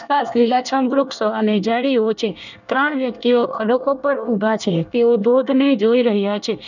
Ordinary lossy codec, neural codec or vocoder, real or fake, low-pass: none; codec, 24 kHz, 1 kbps, SNAC; fake; 7.2 kHz